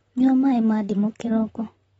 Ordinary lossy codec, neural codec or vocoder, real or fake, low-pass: AAC, 24 kbps; vocoder, 44.1 kHz, 128 mel bands, Pupu-Vocoder; fake; 19.8 kHz